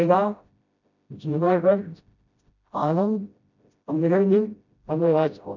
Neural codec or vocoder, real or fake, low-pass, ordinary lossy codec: codec, 16 kHz, 0.5 kbps, FreqCodec, smaller model; fake; 7.2 kHz; none